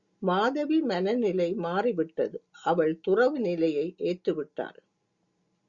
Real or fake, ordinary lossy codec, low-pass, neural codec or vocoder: real; Opus, 64 kbps; 7.2 kHz; none